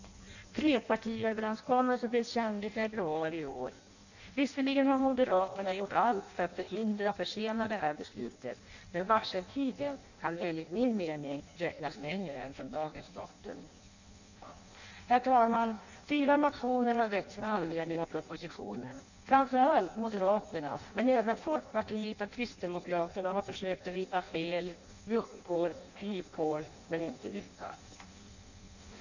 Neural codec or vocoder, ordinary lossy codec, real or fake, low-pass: codec, 16 kHz in and 24 kHz out, 0.6 kbps, FireRedTTS-2 codec; none; fake; 7.2 kHz